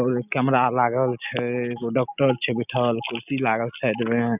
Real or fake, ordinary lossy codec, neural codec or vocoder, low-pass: real; none; none; 3.6 kHz